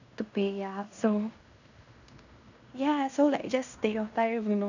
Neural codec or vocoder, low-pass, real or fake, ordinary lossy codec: codec, 16 kHz in and 24 kHz out, 0.9 kbps, LongCat-Audio-Codec, fine tuned four codebook decoder; 7.2 kHz; fake; none